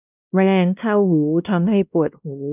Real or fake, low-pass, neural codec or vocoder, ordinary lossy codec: fake; 3.6 kHz; codec, 16 kHz, 1 kbps, X-Codec, WavLM features, trained on Multilingual LibriSpeech; none